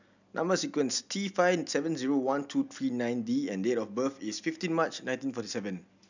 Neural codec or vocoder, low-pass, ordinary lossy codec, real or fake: none; 7.2 kHz; none; real